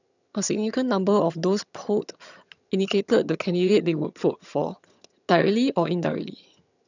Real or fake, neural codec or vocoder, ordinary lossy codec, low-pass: fake; vocoder, 22.05 kHz, 80 mel bands, HiFi-GAN; none; 7.2 kHz